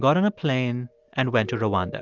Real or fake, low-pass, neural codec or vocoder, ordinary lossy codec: real; 7.2 kHz; none; Opus, 32 kbps